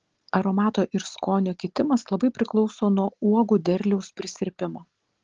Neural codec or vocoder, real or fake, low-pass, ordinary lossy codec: none; real; 7.2 kHz; Opus, 16 kbps